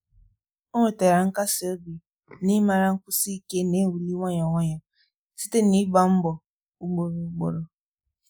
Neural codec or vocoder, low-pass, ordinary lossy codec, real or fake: none; none; none; real